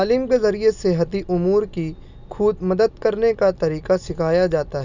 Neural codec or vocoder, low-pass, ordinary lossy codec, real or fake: none; 7.2 kHz; none; real